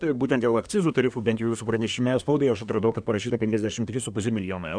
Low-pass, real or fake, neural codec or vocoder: 9.9 kHz; fake; codec, 24 kHz, 1 kbps, SNAC